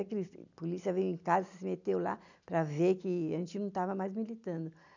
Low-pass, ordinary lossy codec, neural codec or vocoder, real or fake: 7.2 kHz; none; none; real